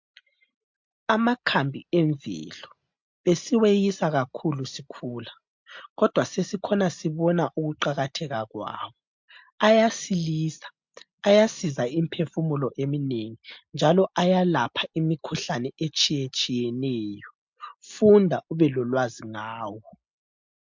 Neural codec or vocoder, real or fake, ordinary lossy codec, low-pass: none; real; MP3, 64 kbps; 7.2 kHz